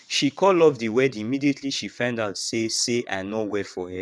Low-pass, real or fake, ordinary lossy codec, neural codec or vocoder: none; fake; none; vocoder, 22.05 kHz, 80 mel bands, WaveNeXt